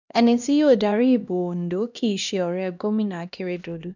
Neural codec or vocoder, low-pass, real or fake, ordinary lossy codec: codec, 16 kHz, 1 kbps, X-Codec, HuBERT features, trained on LibriSpeech; 7.2 kHz; fake; none